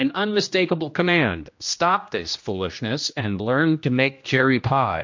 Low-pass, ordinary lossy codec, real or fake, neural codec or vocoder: 7.2 kHz; MP3, 48 kbps; fake; codec, 16 kHz, 1 kbps, X-Codec, HuBERT features, trained on general audio